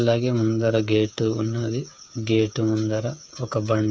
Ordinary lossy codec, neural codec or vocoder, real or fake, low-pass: none; codec, 16 kHz, 8 kbps, FreqCodec, smaller model; fake; none